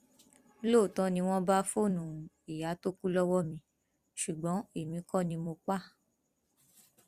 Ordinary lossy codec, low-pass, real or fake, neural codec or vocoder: Opus, 64 kbps; 14.4 kHz; fake; vocoder, 44.1 kHz, 128 mel bands every 256 samples, BigVGAN v2